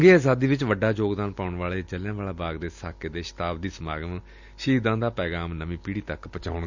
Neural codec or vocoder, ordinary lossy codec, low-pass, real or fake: none; none; 7.2 kHz; real